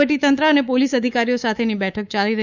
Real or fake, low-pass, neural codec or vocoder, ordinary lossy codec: fake; 7.2 kHz; codec, 24 kHz, 3.1 kbps, DualCodec; none